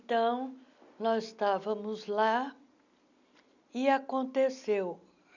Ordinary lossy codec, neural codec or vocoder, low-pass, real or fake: none; none; 7.2 kHz; real